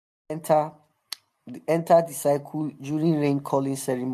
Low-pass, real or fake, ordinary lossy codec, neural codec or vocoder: 14.4 kHz; real; MP3, 64 kbps; none